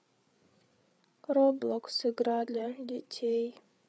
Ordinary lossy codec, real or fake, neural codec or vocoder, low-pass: none; fake; codec, 16 kHz, 8 kbps, FreqCodec, larger model; none